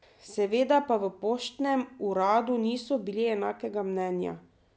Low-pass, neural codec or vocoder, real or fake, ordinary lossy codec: none; none; real; none